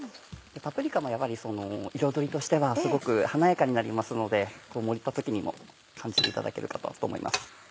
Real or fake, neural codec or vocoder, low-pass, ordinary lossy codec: real; none; none; none